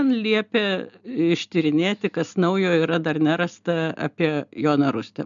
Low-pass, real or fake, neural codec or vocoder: 7.2 kHz; real; none